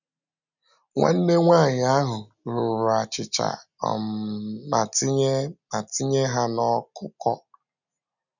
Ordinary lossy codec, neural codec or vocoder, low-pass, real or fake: none; none; 7.2 kHz; real